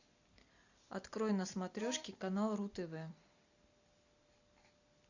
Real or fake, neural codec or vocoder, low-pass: real; none; 7.2 kHz